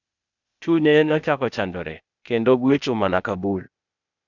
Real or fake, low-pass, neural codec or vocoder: fake; 7.2 kHz; codec, 16 kHz, 0.8 kbps, ZipCodec